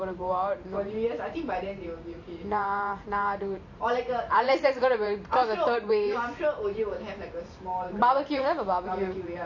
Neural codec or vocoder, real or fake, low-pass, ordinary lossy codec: vocoder, 44.1 kHz, 128 mel bands every 512 samples, BigVGAN v2; fake; 7.2 kHz; none